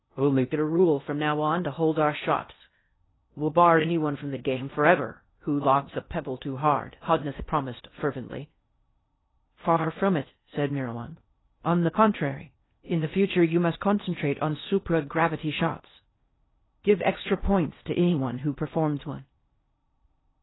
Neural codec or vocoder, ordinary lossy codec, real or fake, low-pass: codec, 16 kHz in and 24 kHz out, 0.6 kbps, FocalCodec, streaming, 2048 codes; AAC, 16 kbps; fake; 7.2 kHz